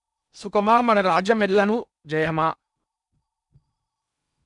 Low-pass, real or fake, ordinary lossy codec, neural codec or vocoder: 10.8 kHz; fake; none; codec, 16 kHz in and 24 kHz out, 0.8 kbps, FocalCodec, streaming, 65536 codes